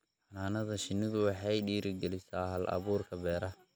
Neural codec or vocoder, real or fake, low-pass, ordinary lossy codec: none; real; none; none